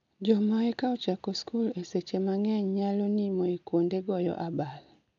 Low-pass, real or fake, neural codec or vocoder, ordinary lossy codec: 7.2 kHz; real; none; none